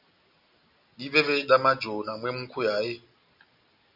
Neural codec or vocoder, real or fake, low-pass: none; real; 5.4 kHz